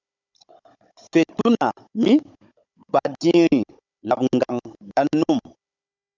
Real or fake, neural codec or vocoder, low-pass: fake; codec, 16 kHz, 16 kbps, FunCodec, trained on Chinese and English, 50 frames a second; 7.2 kHz